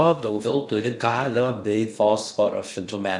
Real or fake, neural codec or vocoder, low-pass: fake; codec, 16 kHz in and 24 kHz out, 0.6 kbps, FocalCodec, streaming, 2048 codes; 10.8 kHz